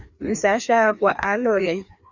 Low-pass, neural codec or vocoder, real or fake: 7.2 kHz; codec, 16 kHz, 2 kbps, FreqCodec, larger model; fake